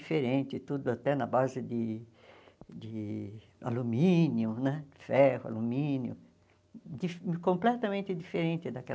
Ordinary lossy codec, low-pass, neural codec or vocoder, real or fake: none; none; none; real